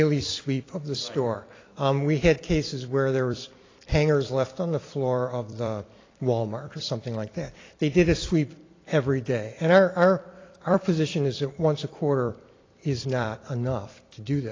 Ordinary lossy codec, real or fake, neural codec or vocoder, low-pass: AAC, 32 kbps; fake; vocoder, 44.1 kHz, 128 mel bands every 256 samples, BigVGAN v2; 7.2 kHz